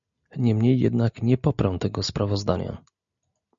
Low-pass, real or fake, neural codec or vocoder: 7.2 kHz; real; none